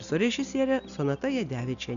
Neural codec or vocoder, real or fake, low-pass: none; real; 7.2 kHz